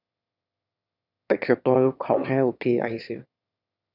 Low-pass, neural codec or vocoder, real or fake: 5.4 kHz; autoencoder, 22.05 kHz, a latent of 192 numbers a frame, VITS, trained on one speaker; fake